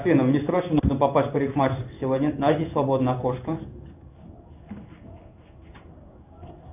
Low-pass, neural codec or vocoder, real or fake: 3.6 kHz; codec, 16 kHz in and 24 kHz out, 1 kbps, XY-Tokenizer; fake